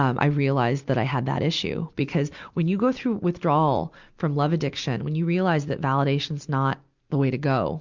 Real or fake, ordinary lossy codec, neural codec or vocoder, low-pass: real; Opus, 64 kbps; none; 7.2 kHz